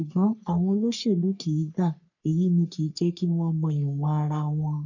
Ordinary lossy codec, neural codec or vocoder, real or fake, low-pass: none; codec, 44.1 kHz, 3.4 kbps, Pupu-Codec; fake; 7.2 kHz